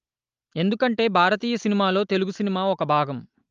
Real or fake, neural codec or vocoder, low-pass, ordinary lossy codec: real; none; 7.2 kHz; Opus, 32 kbps